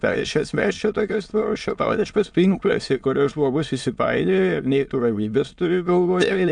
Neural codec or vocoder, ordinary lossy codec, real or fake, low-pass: autoencoder, 22.05 kHz, a latent of 192 numbers a frame, VITS, trained on many speakers; MP3, 64 kbps; fake; 9.9 kHz